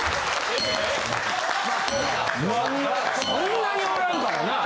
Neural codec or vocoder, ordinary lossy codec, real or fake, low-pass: none; none; real; none